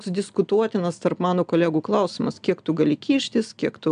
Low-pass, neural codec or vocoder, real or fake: 9.9 kHz; none; real